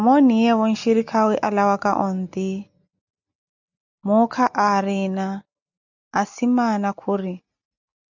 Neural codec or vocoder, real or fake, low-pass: none; real; 7.2 kHz